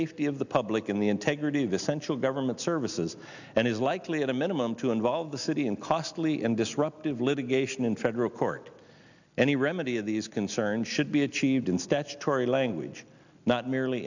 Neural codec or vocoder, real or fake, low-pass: none; real; 7.2 kHz